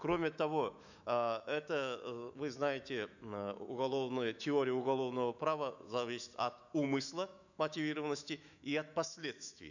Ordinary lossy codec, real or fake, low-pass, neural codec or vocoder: none; fake; 7.2 kHz; autoencoder, 48 kHz, 128 numbers a frame, DAC-VAE, trained on Japanese speech